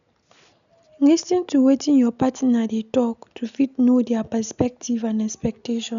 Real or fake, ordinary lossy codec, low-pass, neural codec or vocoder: real; none; 7.2 kHz; none